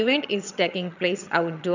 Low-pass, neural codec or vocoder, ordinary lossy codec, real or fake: 7.2 kHz; vocoder, 22.05 kHz, 80 mel bands, HiFi-GAN; none; fake